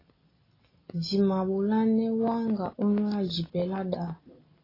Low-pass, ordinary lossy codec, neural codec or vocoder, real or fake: 5.4 kHz; AAC, 24 kbps; none; real